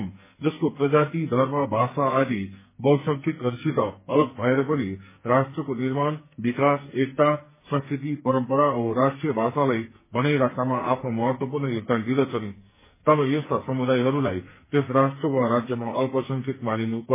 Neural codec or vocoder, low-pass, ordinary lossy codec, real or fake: codec, 44.1 kHz, 2.6 kbps, SNAC; 3.6 kHz; MP3, 16 kbps; fake